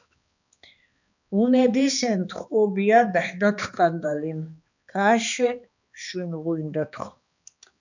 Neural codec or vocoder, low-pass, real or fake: codec, 16 kHz, 2 kbps, X-Codec, HuBERT features, trained on balanced general audio; 7.2 kHz; fake